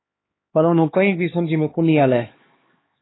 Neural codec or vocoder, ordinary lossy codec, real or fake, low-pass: codec, 16 kHz, 2 kbps, X-Codec, HuBERT features, trained on LibriSpeech; AAC, 16 kbps; fake; 7.2 kHz